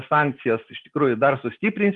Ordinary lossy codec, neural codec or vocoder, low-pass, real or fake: Opus, 24 kbps; none; 10.8 kHz; real